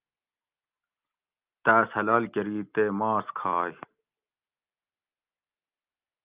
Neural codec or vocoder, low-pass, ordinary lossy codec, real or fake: none; 3.6 kHz; Opus, 24 kbps; real